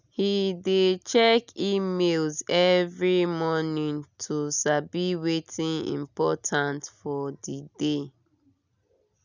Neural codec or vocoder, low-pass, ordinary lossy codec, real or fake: none; 7.2 kHz; none; real